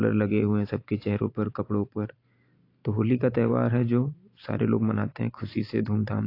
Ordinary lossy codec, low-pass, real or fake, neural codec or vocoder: AAC, 32 kbps; 5.4 kHz; fake; vocoder, 44.1 kHz, 128 mel bands every 512 samples, BigVGAN v2